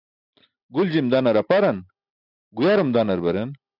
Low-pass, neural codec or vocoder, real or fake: 5.4 kHz; none; real